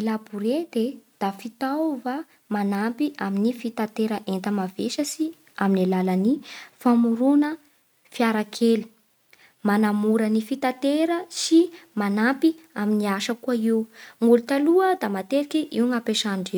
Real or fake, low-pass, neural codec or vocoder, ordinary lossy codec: real; none; none; none